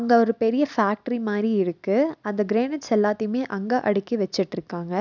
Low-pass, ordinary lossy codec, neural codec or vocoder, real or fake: 7.2 kHz; none; none; real